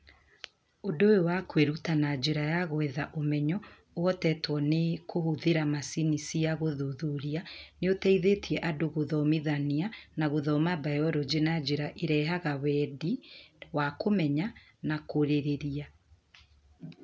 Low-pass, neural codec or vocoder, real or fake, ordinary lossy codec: none; none; real; none